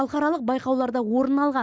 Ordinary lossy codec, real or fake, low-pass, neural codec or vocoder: none; real; none; none